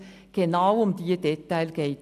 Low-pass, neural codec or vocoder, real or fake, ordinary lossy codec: 14.4 kHz; none; real; none